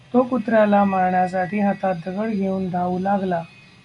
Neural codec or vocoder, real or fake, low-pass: none; real; 10.8 kHz